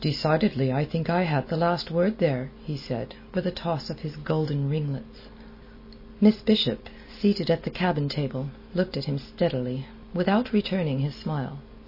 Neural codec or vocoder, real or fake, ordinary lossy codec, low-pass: none; real; MP3, 24 kbps; 5.4 kHz